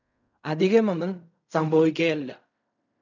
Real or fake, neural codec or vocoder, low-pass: fake; codec, 16 kHz in and 24 kHz out, 0.4 kbps, LongCat-Audio-Codec, fine tuned four codebook decoder; 7.2 kHz